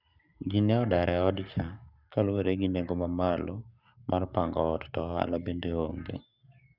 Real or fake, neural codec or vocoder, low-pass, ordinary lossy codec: fake; codec, 44.1 kHz, 7.8 kbps, Pupu-Codec; 5.4 kHz; none